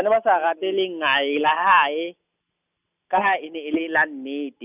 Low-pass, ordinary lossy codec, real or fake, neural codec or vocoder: 3.6 kHz; none; real; none